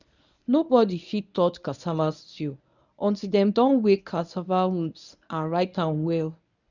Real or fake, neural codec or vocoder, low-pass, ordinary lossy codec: fake; codec, 24 kHz, 0.9 kbps, WavTokenizer, medium speech release version 1; 7.2 kHz; none